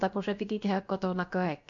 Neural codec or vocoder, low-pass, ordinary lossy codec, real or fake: codec, 16 kHz, about 1 kbps, DyCAST, with the encoder's durations; 7.2 kHz; MP3, 48 kbps; fake